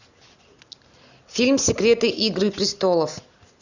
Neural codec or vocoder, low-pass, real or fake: none; 7.2 kHz; real